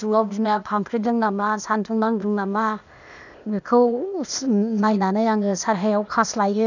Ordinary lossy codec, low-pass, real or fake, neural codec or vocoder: none; 7.2 kHz; fake; codec, 16 kHz, 0.8 kbps, ZipCodec